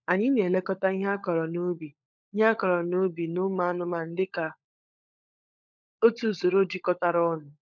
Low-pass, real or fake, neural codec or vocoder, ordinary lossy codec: 7.2 kHz; fake; codec, 16 kHz, 16 kbps, FunCodec, trained on LibriTTS, 50 frames a second; MP3, 64 kbps